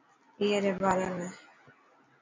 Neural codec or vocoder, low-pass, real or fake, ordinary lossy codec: none; 7.2 kHz; real; MP3, 64 kbps